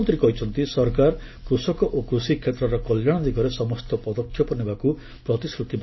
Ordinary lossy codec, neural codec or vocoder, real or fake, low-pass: MP3, 24 kbps; none; real; 7.2 kHz